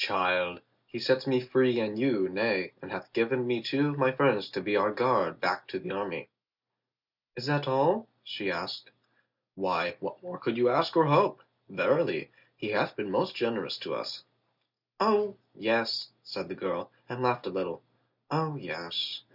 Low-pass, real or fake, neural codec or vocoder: 5.4 kHz; real; none